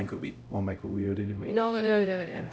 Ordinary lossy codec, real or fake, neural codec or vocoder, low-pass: none; fake; codec, 16 kHz, 0.5 kbps, X-Codec, HuBERT features, trained on LibriSpeech; none